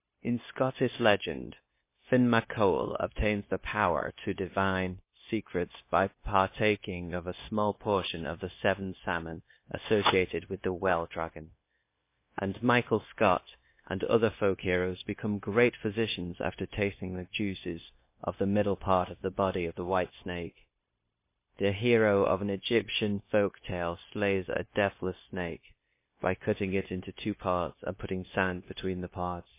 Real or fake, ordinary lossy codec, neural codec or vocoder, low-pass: fake; MP3, 24 kbps; codec, 16 kHz, 0.9 kbps, LongCat-Audio-Codec; 3.6 kHz